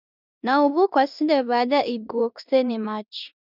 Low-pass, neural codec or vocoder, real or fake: 5.4 kHz; codec, 16 kHz in and 24 kHz out, 0.9 kbps, LongCat-Audio-Codec, four codebook decoder; fake